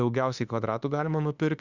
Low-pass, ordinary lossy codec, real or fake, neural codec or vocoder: 7.2 kHz; Opus, 64 kbps; fake; autoencoder, 48 kHz, 32 numbers a frame, DAC-VAE, trained on Japanese speech